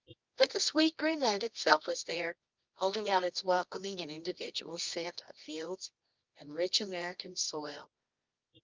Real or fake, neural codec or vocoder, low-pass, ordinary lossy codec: fake; codec, 24 kHz, 0.9 kbps, WavTokenizer, medium music audio release; 7.2 kHz; Opus, 24 kbps